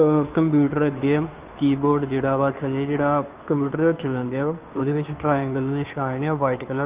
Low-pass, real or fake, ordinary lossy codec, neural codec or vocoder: 3.6 kHz; fake; Opus, 32 kbps; codec, 16 kHz, 2 kbps, FunCodec, trained on Chinese and English, 25 frames a second